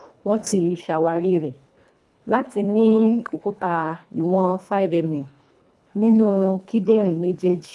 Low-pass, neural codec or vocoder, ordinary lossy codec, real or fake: none; codec, 24 kHz, 1.5 kbps, HILCodec; none; fake